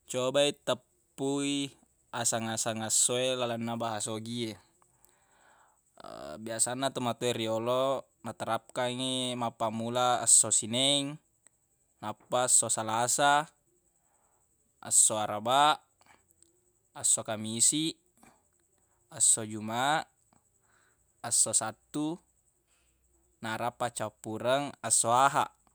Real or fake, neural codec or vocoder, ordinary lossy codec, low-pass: real; none; none; none